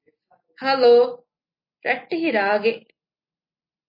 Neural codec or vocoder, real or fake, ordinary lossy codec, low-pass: none; real; MP3, 24 kbps; 5.4 kHz